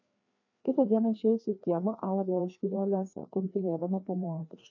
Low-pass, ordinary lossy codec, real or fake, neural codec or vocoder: 7.2 kHz; AAC, 48 kbps; fake; codec, 16 kHz, 2 kbps, FreqCodec, larger model